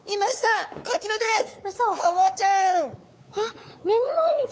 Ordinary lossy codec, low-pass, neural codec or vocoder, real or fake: none; none; codec, 16 kHz, 4 kbps, X-Codec, WavLM features, trained on Multilingual LibriSpeech; fake